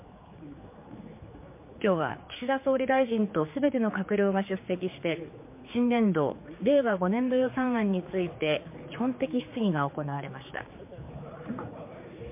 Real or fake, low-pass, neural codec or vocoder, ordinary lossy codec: fake; 3.6 kHz; codec, 16 kHz, 4 kbps, X-Codec, HuBERT features, trained on general audio; MP3, 24 kbps